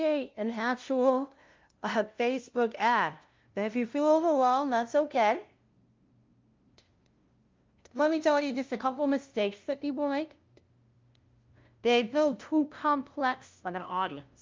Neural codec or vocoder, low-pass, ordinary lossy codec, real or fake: codec, 16 kHz, 0.5 kbps, FunCodec, trained on LibriTTS, 25 frames a second; 7.2 kHz; Opus, 24 kbps; fake